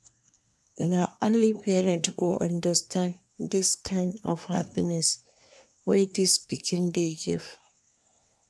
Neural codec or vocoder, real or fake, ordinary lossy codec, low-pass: codec, 24 kHz, 1 kbps, SNAC; fake; none; none